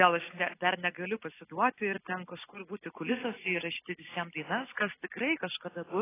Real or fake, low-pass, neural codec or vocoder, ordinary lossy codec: real; 3.6 kHz; none; AAC, 16 kbps